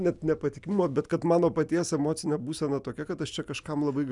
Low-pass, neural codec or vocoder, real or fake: 10.8 kHz; none; real